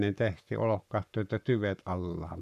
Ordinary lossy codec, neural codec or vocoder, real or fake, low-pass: none; codec, 44.1 kHz, 7.8 kbps, Pupu-Codec; fake; 14.4 kHz